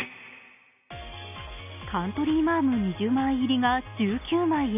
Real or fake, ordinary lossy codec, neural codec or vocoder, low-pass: real; none; none; 3.6 kHz